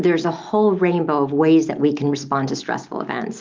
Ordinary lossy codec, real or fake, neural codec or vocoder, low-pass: Opus, 32 kbps; real; none; 7.2 kHz